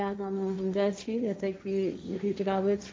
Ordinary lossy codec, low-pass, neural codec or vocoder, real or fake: none; none; codec, 16 kHz, 1.1 kbps, Voila-Tokenizer; fake